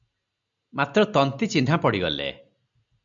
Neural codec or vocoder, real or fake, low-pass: none; real; 7.2 kHz